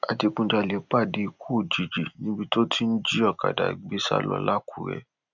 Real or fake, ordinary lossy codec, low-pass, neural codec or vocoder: real; none; 7.2 kHz; none